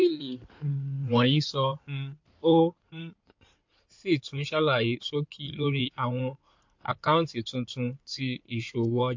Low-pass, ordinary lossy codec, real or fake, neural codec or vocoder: 7.2 kHz; MP3, 48 kbps; fake; codec, 16 kHz in and 24 kHz out, 2.2 kbps, FireRedTTS-2 codec